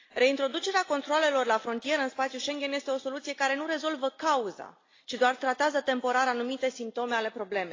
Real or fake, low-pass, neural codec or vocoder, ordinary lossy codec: real; 7.2 kHz; none; AAC, 32 kbps